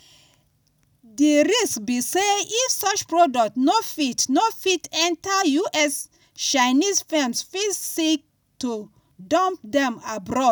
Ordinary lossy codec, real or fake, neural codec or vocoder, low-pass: none; real; none; none